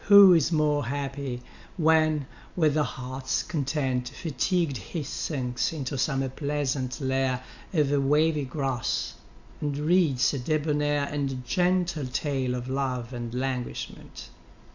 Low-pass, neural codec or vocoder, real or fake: 7.2 kHz; none; real